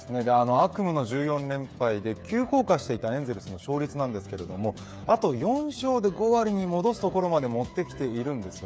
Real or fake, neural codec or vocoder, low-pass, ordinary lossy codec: fake; codec, 16 kHz, 8 kbps, FreqCodec, smaller model; none; none